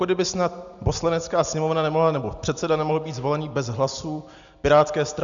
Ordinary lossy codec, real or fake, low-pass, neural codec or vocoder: MP3, 96 kbps; real; 7.2 kHz; none